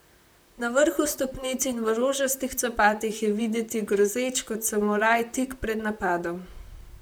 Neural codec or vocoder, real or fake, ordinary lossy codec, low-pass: vocoder, 44.1 kHz, 128 mel bands, Pupu-Vocoder; fake; none; none